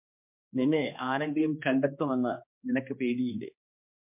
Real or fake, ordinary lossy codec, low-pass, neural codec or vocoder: fake; MP3, 32 kbps; 3.6 kHz; codec, 16 kHz, 2 kbps, X-Codec, HuBERT features, trained on general audio